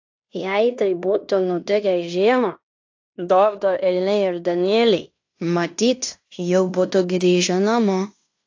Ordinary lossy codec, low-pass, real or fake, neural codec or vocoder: AAC, 48 kbps; 7.2 kHz; fake; codec, 16 kHz in and 24 kHz out, 0.9 kbps, LongCat-Audio-Codec, fine tuned four codebook decoder